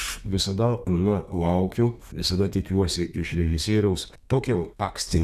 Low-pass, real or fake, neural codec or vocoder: 14.4 kHz; fake; codec, 32 kHz, 1.9 kbps, SNAC